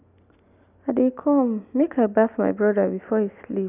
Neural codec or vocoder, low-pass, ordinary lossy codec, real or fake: none; 3.6 kHz; none; real